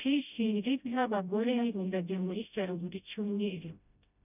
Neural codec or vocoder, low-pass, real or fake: codec, 16 kHz, 0.5 kbps, FreqCodec, smaller model; 3.6 kHz; fake